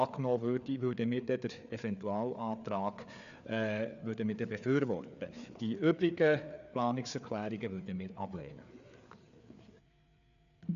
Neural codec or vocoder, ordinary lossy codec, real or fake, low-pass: codec, 16 kHz, 4 kbps, FreqCodec, larger model; none; fake; 7.2 kHz